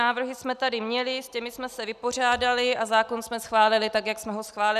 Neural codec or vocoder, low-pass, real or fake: none; 14.4 kHz; real